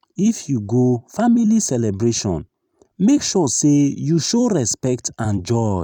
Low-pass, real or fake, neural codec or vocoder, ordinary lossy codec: 19.8 kHz; fake; vocoder, 44.1 kHz, 128 mel bands every 256 samples, BigVGAN v2; none